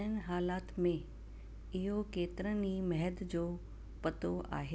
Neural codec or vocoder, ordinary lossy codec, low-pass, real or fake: none; none; none; real